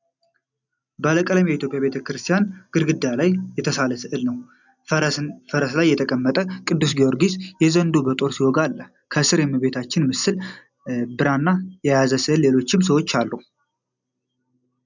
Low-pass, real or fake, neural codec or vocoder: 7.2 kHz; real; none